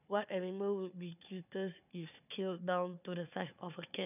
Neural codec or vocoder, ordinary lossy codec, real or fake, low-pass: codec, 16 kHz, 4 kbps, FunCodec, trained on Chinese and English, 50 frames a second; none; fake; 3.6 kHz